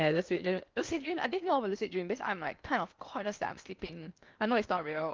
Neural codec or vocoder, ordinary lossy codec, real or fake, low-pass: codec, 16 kHz in and 24 kHz out, 0.8 kbps, FocalCodec, streaming, 65536 codes; Opus, 24 kbps; fake; 7.2 kHz